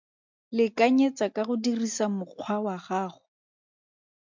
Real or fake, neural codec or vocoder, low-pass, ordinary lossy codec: real; none; 7.2 kHz; MP3, 64 kbps